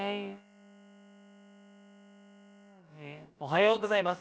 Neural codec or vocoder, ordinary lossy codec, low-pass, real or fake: codec, 16 kHz, about 1 kbps, DyCAST, with the encoder's durations; none; none; fake